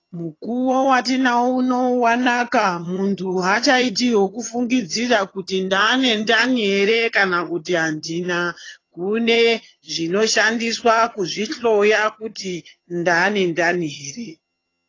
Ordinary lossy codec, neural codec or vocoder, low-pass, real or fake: AAC, 32 kbps; vocoder, 22.05 kHz, 80 mel bands, HiFi-GAN; 7.2 kHz; fake